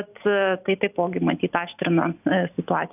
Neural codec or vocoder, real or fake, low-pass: none; real; 3.6 kHz